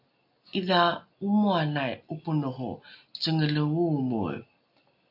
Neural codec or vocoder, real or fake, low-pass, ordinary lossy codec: none; real; 5.4 kHz; AAC, 48 kbps